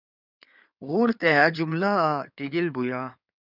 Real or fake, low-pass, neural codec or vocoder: fake; 5.4 kHz; codec, 16 kHz in and 24 kHz out, 2.2 kbps, FireRedTTS-2 codec